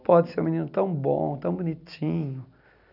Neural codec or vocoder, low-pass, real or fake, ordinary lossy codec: none; 5.4 kHz; real; none